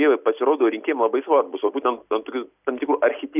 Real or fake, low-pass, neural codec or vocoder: real; 3.6 kHz; none